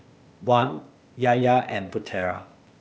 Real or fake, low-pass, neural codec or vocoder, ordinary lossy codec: fake; none; codec, 16 kHz, 0.8 kbps, ZipCodec; none